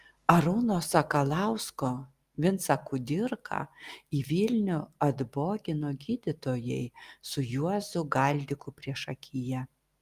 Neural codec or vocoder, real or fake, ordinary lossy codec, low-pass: none; real; Opus, 32 kbps; 14.4 kHz